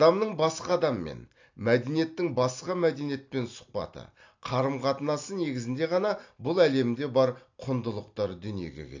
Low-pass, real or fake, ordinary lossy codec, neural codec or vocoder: 7.2 kHz; real; AAC, 48 kbps; none